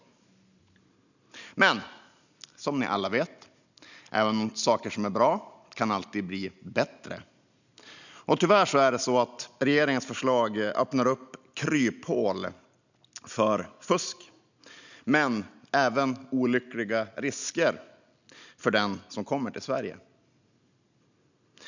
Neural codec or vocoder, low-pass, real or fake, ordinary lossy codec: none; 7.2 kHz; real; none